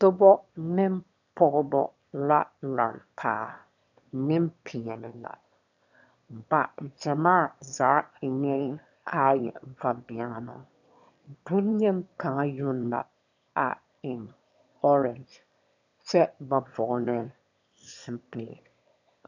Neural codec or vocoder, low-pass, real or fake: autoencoder, 22.05 kHz, a latent of 192 numbers a frame, VITS, trained on one speaker; 7.2 kHz; fake